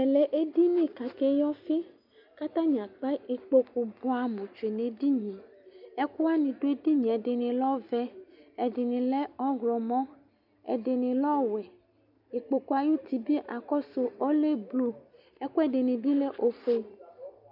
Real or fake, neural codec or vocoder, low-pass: real; none; 5.4 kHz